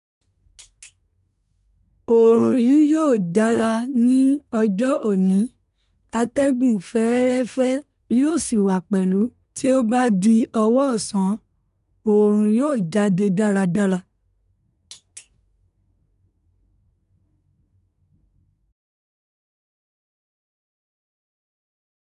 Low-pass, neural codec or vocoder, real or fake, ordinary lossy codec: 10.8 kHz; codec, 24 kHz, 1 kbps, SNAC; fake; none